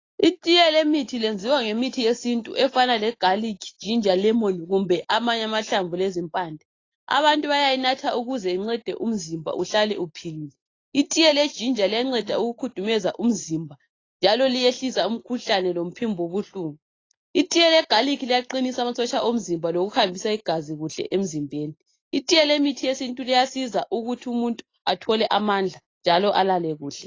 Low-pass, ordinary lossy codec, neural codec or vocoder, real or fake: 7.2 kHz; AAC, 32 kbps; none; real